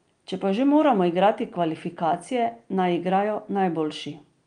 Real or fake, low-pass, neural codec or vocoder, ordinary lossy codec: real; 9.9 kHz; none; Opus, 32 kbps